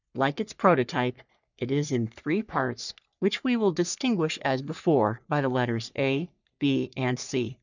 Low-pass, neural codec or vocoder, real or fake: 7.2 kHz; codec, 44.1 kHz, 3.4 kbps, Pupu-Codec; fake